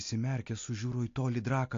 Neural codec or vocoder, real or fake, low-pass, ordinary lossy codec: none; real; 7.2 kHz; AAC, 48 kbps